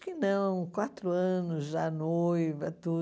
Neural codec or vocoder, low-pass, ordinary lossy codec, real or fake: none; none; none; real